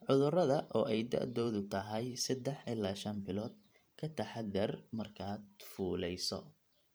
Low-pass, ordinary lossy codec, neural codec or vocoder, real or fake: none; none; vocoder, 44.1 kHz, 128 mel bands every 512 samples, BigVGAN v2; fake